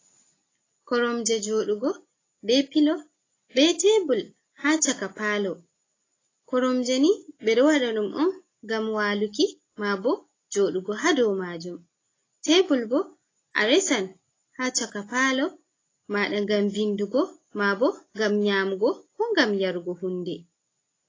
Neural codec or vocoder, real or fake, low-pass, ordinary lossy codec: none; real; 7.2 kHz; AAC, 32 kbps